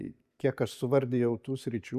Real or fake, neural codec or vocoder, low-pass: real; none; 14.4 kHz